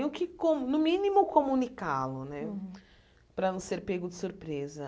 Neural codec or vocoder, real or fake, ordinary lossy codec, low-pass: none; real; none; none